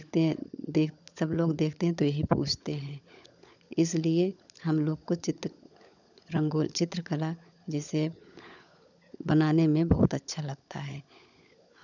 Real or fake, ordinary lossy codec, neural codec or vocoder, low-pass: fake; none; codec, 16 kHz, 16 kbps, FunCodec, trained on LibriTTS, 50 frames a second; 7.2 kHz